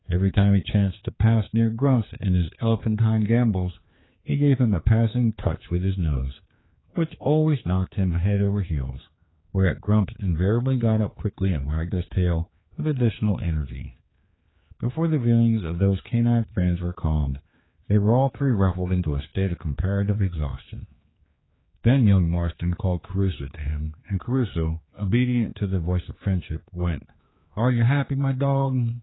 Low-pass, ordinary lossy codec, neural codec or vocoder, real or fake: 7.2 kHz; AAC, 16 kbps; codec, 16 kHz, 4 kbps, X-Codec, HuBERT features, trained on general audio; fake